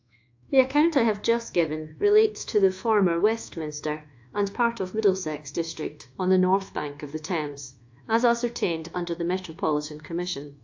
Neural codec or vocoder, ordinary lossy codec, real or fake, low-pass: codec, 24 kHz, 1.2 kbps, DualCodec; AAC, 48 kbps; fake; 7.2 kHz